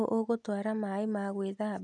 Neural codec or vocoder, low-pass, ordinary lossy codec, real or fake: none; 10.8 kHz; none; real